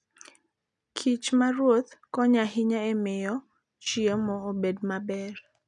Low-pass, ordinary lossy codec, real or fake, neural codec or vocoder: 10.8 kHz; none; real; none